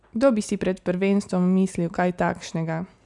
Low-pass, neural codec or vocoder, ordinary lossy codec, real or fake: 10.8 kHz; none; none; real